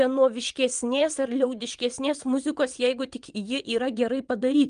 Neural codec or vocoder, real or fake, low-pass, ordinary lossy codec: vocoder, 22.05 kHz, 80 mel bands, WaveNeXt; fake; 9.9 kHz; Opus, 32 kbps